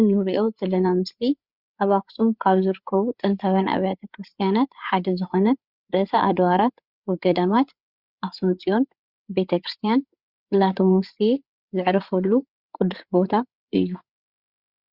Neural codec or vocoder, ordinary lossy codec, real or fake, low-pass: codec, 16 kHz, 8 kbps, FreqCodec, larger model; Opus, 64 kbps; fake; 5.4 kHz